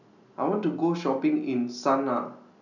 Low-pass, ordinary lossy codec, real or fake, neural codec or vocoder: 7.2 kHz; none; real; none